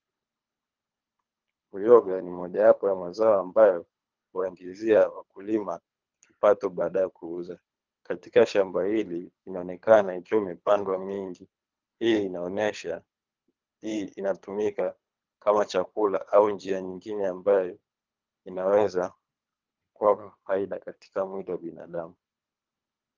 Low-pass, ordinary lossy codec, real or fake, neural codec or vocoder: 7.2 kHz; Opus, 24 kbps; fake; codec, 24 kHz, 3 kbps, HILCodec